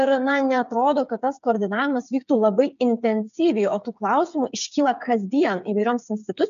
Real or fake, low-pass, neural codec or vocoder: fake; 7.2 kHz; codec, 16 kHz, 8 kbps, FreqCodec, smaller model